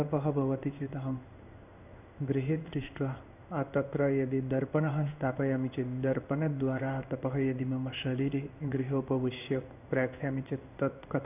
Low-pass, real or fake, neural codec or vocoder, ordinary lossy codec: 3.6 kHz; fake; codec, 16 kHz in and 24 kHz out, 1 kbps, XY-Tokenizer; none